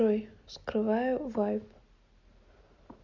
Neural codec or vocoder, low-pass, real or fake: none; 7.2 kHz; real